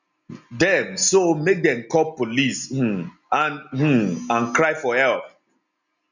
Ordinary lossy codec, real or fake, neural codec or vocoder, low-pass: none; real; none; 7.2 kHz